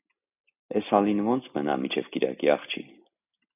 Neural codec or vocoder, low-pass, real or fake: none; 3.6 kHz; real